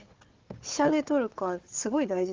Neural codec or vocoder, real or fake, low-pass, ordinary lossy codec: codec, 16 kHz, 4 kbps, FunCodec, trained on Chinese and English, 50 frames a second; fake; 7.2 kHz; Opus, 16 kbps